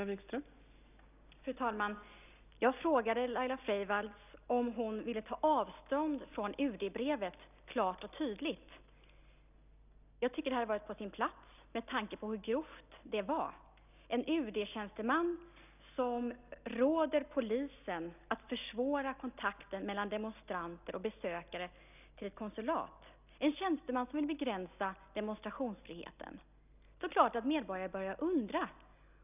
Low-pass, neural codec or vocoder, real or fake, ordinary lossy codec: 3.6 kHz; none; real; none